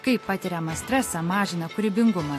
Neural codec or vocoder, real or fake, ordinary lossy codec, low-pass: none; real; AAC, 48 kbps; 14.4 kHz